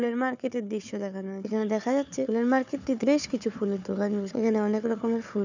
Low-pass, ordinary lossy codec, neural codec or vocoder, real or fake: 7.2 kHz; none; codec, 16 kHz, 4 kbps, FunCodec, trained on Chinese and English, 50 frames a second; fake